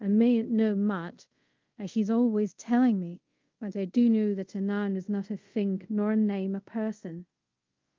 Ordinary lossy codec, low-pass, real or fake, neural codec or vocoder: Opus, 32 kbps; 7.2 kHz; fake; codec, 24 kHz, 0.5 kbps, DualCodec